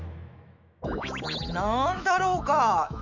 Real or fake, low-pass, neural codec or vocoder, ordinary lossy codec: fake; 7.2 kHz; autoencoder, 48 kHz, 128 numbers a frame, DAC-VAE, trained on Japanese speech; none